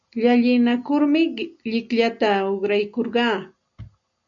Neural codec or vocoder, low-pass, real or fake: none; 7.2 kHz; real